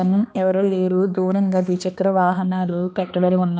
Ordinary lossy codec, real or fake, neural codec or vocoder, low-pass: none; fake; codec, 16 kHz, 2 kbps, X-Codec, HuBERT features, trained on balanced general audio; none